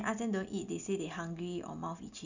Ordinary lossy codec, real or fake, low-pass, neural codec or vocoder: none; real; 7.2 kHz; none